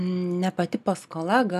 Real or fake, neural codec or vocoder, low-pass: real; none; 14.4 kHz